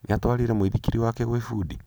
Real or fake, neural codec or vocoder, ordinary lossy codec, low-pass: real; none; none; none